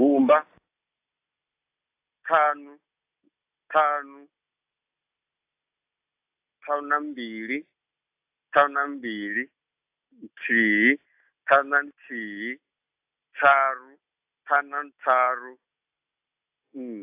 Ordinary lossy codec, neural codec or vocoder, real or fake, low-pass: AAC, 32 kbps; none; real; 3.6 kHz